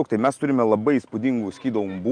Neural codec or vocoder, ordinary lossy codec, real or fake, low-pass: none; Opus, 32 kbps; real; 9.9 kHz